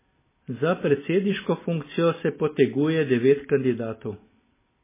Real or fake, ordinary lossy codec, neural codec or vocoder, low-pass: real; MP3, 16 kbps; none; 3.6 kHz